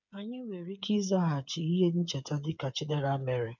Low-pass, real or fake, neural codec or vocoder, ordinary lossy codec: 7.2 kHz; fake; codec, 16 kHz, 8 kbps, FreqCodec, smaller model; none